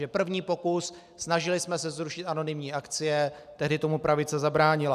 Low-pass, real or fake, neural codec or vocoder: 14.4 kHz; real; none